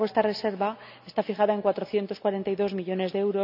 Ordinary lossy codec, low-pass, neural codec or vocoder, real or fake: none; 5.4 kHz; none; real